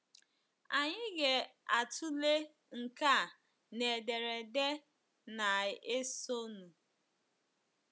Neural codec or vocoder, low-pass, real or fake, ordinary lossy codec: none; none; real; none